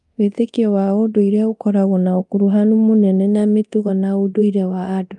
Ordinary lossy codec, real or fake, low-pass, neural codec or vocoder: Opus, 32 kbps; fake; 10.8 kHz; codec, 24 kHz, 0.9 kbps, DualCodec